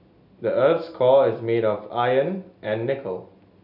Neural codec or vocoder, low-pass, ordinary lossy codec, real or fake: none; 5.4 kHz; none; real